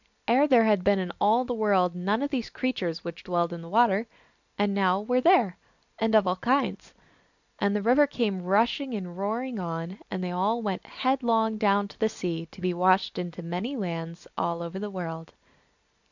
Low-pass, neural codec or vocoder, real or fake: 7.2 kHz; none; real